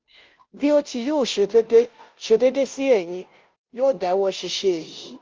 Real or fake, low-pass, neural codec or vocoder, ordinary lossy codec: fake; 7.2 kHz; codec, 16 kHz, 0.5 kbps, FunCodec, trained on Chinese and English, 25 frames a second; Opus, 32 kbps